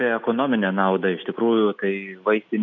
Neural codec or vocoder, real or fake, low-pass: none; real; 7.2 kHz